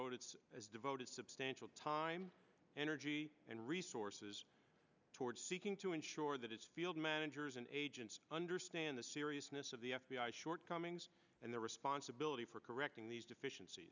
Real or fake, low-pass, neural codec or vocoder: real; 7.2 kHz; none